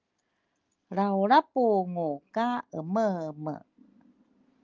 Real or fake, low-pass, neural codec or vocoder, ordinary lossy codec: real; 7.2 kHz; none; Opus, 32 kbps